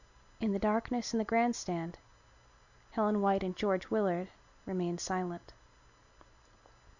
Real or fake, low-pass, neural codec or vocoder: real; 7.2 kHz; none